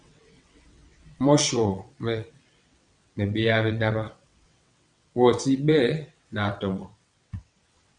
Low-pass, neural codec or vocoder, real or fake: 9.9 kHz; vocoder, 22.05 kHz, 80 mel bands, WaveNeXt; fake